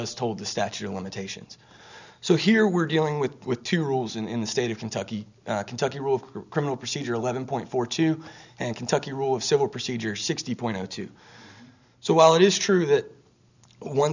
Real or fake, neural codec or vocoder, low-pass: real; none; 7.2 kHz